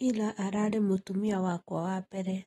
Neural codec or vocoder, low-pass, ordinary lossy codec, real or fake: none; 19.8 kHz; AAC, 32 kbps; real